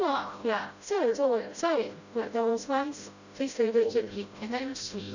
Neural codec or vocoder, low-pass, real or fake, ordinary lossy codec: codec, 16 kHz, 0.5 kbps, FreqCodec, smaller model; 7.2 kHz; fake; none